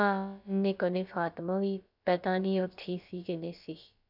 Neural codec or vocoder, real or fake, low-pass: codec, 16 kHz, about 1 kbps, DyCAST, with the encoder's durations; fake; 5.4 kHz